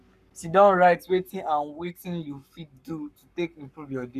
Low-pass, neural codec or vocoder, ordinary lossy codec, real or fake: 14.4 kHz; codec, 44.1 kHz, 7.8 kbps, Pupu-Codec; none; fake